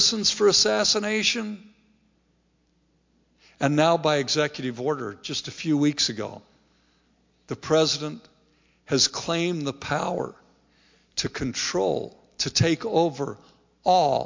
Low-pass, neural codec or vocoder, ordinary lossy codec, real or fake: 7.2 kHz; none; MP3, 48 kbps; real